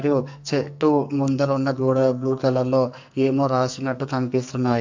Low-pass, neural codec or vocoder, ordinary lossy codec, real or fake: 7.2 kHz; codec, 44.1 kHz, 2.6 kbps, SNAC; MP3, 64 kbps; fake